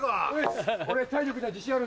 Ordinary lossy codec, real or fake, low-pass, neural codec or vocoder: none; real; none; none